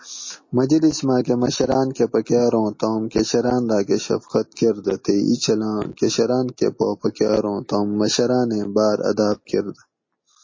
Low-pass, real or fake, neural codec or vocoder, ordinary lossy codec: 7.2 kHz; real; none; MP3, 32 kbps